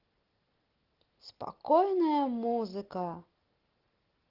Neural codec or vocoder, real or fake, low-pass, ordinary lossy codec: none; real; 5.4 kHz; Opus, 16 kbps